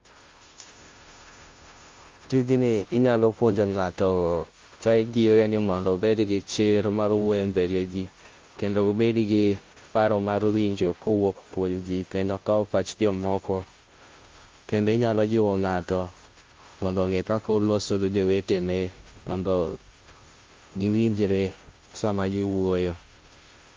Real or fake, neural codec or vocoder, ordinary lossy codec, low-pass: fake; codec, 16 kHz, 0.5 kbps, FunCodec, trained on Chinese and English, 25 frames a second; Opus, 32 kbps; 7.2 kHz